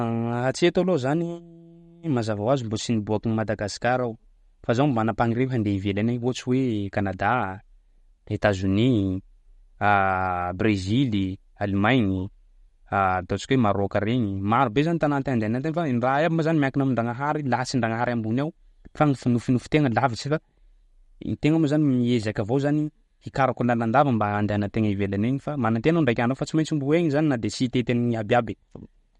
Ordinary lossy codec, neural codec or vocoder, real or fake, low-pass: MP3, 48 kbps; none; real; 19.8 kHz